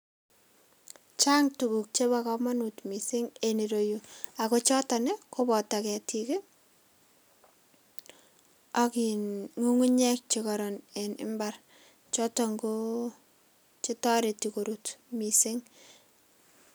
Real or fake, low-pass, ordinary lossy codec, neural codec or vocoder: real; none; none; none